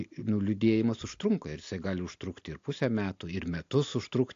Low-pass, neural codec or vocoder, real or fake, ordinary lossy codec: 7.2 kHz; none; real; AAC, 48 kbps